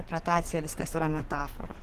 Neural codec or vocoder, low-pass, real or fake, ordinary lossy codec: codec, 44.1 kHz, 2.6 kbps, SNAC; 14.4 kHz; fake; Opus, 16 kbps